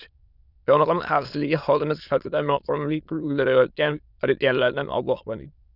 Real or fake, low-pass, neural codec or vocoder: fake; 5.4 kHz; autoencoder, 22.05 kHz, a latent of 192 numbers a frame, VITS, trained on many speakers